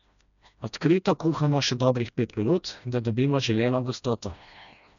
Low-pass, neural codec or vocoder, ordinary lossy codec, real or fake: 7.2 kHz; codec, 16 kHz, 1 kbps, FreqCodec, smaller model; none; fake